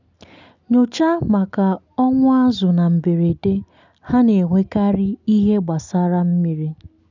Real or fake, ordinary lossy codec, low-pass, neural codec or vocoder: real; none; 7.2 kHz; none